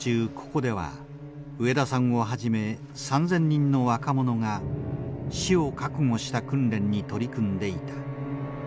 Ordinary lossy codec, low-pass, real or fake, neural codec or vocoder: none; none; real; none